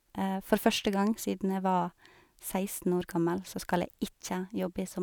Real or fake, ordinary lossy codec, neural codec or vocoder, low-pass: real; none; none; none